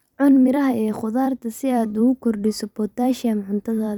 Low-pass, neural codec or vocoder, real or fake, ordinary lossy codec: 19.8 kHz; vocoder, 44.1 kHz, 128 mel bands every 256 samples, BigVGAN v2; fake; none